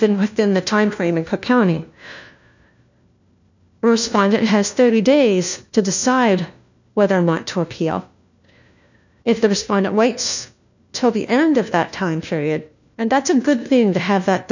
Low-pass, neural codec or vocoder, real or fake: 7.2 kHz; codec, 16 kHz, 1 kbps, FunCodec, trained on LibriTTS, 50 frames a second; fake